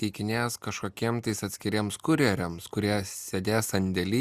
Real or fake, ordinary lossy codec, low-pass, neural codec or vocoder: real; Opus, 64 kbps; 14.4 kHz; none